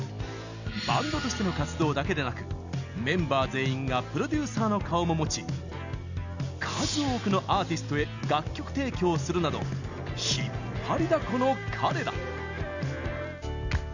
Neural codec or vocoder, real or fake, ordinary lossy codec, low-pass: none; real; Opus, 64 kbps; 7.2 kHz